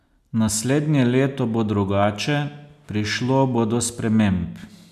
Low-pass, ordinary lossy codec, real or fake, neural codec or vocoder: 14.4 kHz; none; real; none